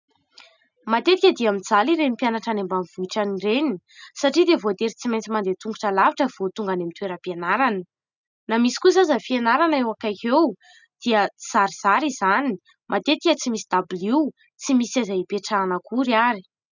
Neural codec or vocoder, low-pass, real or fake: none; 7.2 kHz; real